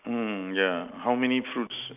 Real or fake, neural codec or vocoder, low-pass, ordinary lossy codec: real; none; 3.6 kHz; none